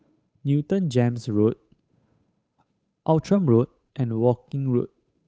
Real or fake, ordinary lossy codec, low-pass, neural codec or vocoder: fake; none; none; codec, 16 kHz, 8 kbps, FunCodec, trained on Chinese and English, 25 frames a second